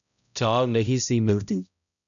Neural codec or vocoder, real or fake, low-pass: codec, 16 kHz, 0.5 kbps, X-Codec, HuBERT features, trained on balanced general audio; fake; 7.2 kHz